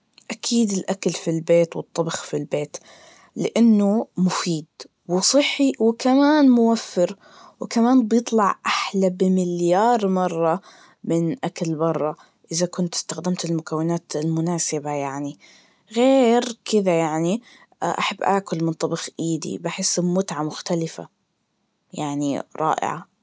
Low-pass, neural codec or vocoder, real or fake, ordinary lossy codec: none; none; real; none